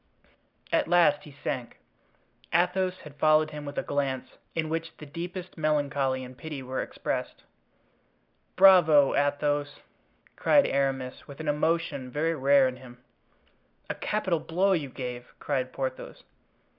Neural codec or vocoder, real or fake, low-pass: none; real; 5.4 kHz